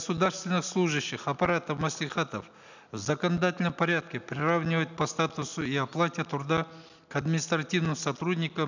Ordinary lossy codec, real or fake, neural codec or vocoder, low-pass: none; real; none; 7.2 kHz